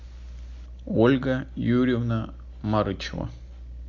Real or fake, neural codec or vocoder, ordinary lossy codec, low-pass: real; none; MP3, 64 kbps; 7.2 kHz